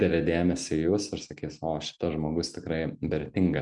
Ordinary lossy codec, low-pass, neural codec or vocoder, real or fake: MP3, 96 kbps; 10.8 kHz; none; real